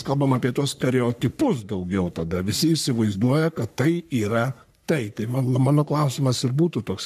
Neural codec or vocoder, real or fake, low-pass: codec, 44.1 kHz, 3.4 kbps, Pupu-Codec; fake; 14.4 kHz